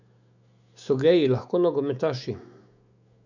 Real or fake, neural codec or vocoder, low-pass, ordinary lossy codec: fake; autoencoder, 48 kHz, 128 numbers a frame, DAC-VAE, trained on Japanese speech; 7.2 kHz; none